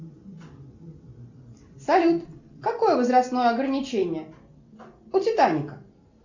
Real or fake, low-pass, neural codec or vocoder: real; 7.2 kHz; none